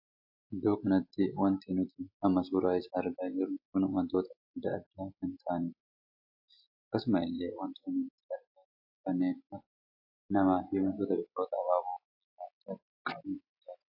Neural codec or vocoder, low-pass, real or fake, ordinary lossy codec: none; 5.4 kHz; real; AAC, 48 kbps